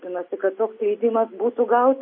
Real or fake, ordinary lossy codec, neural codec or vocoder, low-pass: real; MP3, 24 kbps; none; 5.4 kHz